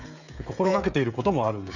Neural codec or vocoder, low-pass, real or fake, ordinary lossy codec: codec, 16 kHz, 16 kbps, FreqCodec, smaller model; 7.2 kHz; fake; none